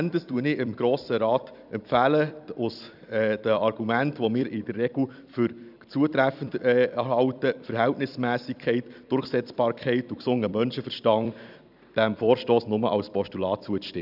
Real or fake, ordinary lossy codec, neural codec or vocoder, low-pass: fake; none; vocoder, 44.1 kHz, 128 mel bands every 256 samples, BigVGAN v2; 5.4 kHz